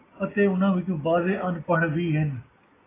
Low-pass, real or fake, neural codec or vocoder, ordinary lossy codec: 3.6 kHz; real; none; AAC, 16 kbps